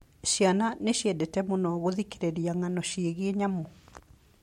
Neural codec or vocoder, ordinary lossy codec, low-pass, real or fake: none; MP3, 64 kbps; 19.8 kHz; real